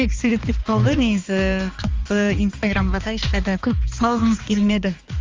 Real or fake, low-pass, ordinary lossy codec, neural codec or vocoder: fake; 7.2 kHz; Opus, 32 kbps; codec, 16 kHz, 2 kbps, X-Codec, HuBERT features, trained on balanced general audio